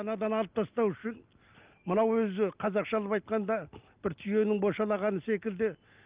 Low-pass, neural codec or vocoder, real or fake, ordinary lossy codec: 3.6 kHz; none; real; Opus, 24 kbps